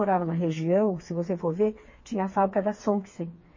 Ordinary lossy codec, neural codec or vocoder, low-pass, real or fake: MP3, 32 kbps; codec, 16 kHz, 4 kbps, FreqCodec, smaller model; 7.2 kHz; fake